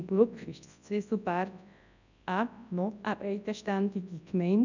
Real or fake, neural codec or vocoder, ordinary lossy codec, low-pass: fake; codec, 24 kHz, 0.9 kbps, WavTokenizer, large speech release; none; 7.2 kHz